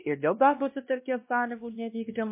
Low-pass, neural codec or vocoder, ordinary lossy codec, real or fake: 3.6 kHz; codec, 16 kHz, 1 kbps, X-Codec, WavLM features, trained on Multilingual LibriSpeech; MP3, 24 kbps; fake